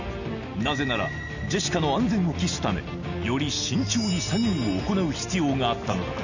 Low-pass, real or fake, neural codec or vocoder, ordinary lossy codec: 7.2 kHz; real; none; none